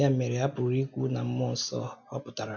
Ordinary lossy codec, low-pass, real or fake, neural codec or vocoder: none; 7.2 kHz; real; none